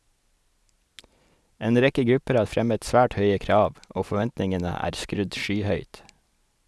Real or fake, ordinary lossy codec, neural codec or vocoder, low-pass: real; none; none; none